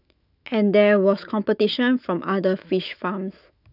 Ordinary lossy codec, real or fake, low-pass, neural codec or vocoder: none; fake; 5.4 kHz; autoencoder, 48 kHz, 128 numbers a frame, DAC-VAE, trained on Japanese speech